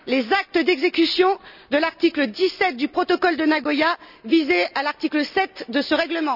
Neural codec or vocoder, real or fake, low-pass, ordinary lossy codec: none; real; 5.4 kHz; none